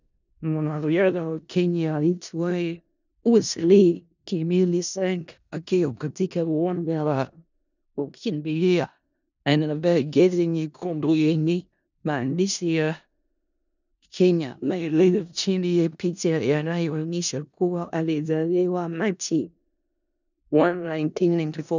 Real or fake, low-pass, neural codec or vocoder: fake; 7.2 kHz; codec, 16 kHz in and 24 kHz out, 0.4 kbps, LongCat-Audio-Codec, four codebook decoder